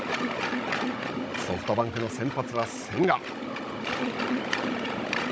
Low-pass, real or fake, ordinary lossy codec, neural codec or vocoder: none; fake; none; codec, 16 kHz, 16 kbps, FunCodec, trained on Chinese and English, 50 frames a second